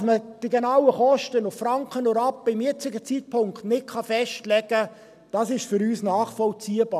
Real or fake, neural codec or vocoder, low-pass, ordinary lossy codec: real; none; 14.4 kHz; none